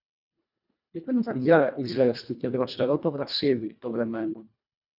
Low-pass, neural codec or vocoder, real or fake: 5.4 kHz; codec, 24 kHz, 1.5 kbps, HILCodec; fake